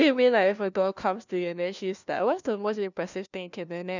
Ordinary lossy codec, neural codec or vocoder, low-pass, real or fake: none; codec, 16 kHz, 1 kbps, FunCodec, trained on LibriTTS, 50 frames a second; 7.2 kHz; fake